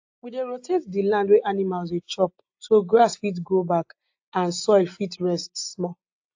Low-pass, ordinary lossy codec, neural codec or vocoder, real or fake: 7.2 kHz; AAC, 48 kbps; none; real